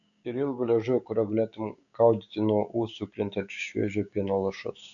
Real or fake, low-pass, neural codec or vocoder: fake; 7.2 kHz; codec, 16 kHz, 6 kbps, DAC